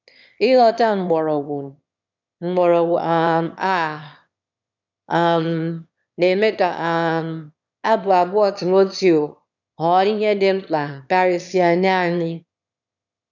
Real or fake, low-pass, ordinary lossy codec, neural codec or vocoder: fake; 7.2 kHz; none; autoencoder, 22.05 kHz, a latent of 192 numbers a frame, VITS, trained on one speaker